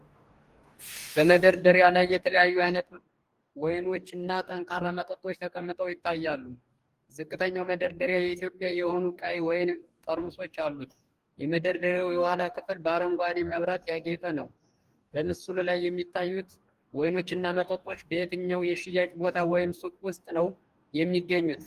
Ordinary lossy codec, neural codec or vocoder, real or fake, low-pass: Opus, 24 kbps; codec, 44.1 kHz, 2.6 kbps, DAC; fake; 14.4 kHz